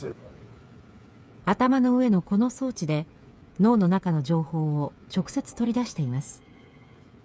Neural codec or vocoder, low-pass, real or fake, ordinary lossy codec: codec, 16 kHz, 8 kbps, FreqCodec, smaller model; none; fake; none